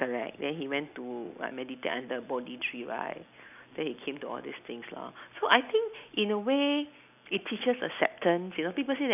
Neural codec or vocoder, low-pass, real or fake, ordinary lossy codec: none; 3.6 kHz; real; none